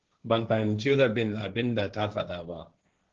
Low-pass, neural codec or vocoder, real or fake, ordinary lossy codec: 7.2 kHz; codec, 16 kHz, 1.1 kbps, Voila-Tokenizer; fake; Opus, 16 kbps